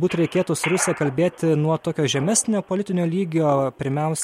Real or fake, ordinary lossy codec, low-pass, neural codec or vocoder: real; MP3, 64 kbps; 19.8 kHz; none